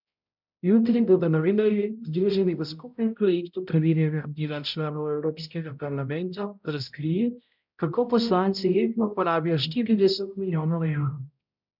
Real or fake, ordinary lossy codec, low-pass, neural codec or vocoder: fake; none; 5.4 kHz; codec, 16 kHz, 0.5 kbps, X-Codec, HuBERT features, trained on balanced general audio